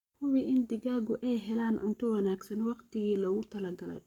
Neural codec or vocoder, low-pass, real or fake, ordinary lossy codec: codec, 44.1 kHz, 7.8 kbps, Pupu-Codec; 19.8 kHz; fake; none